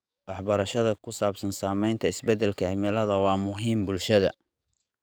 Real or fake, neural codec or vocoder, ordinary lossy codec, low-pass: fake; codec, 44.1 kHz, 7.8 kbps, DAC; none; none